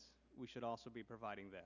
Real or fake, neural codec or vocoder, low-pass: real; none; 7.2 kHz